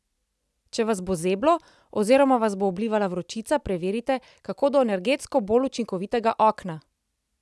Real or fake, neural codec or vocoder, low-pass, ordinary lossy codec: real; none; none; none